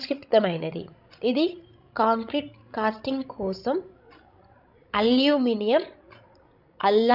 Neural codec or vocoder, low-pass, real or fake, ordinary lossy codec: codec, 16 kHz, 16 kbps, FreqCodec, larger model; 5.4 kHz; fake; none